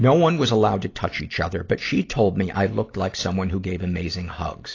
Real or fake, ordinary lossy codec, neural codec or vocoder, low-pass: real; AAC, 32 kbps; none; 7.2 kHz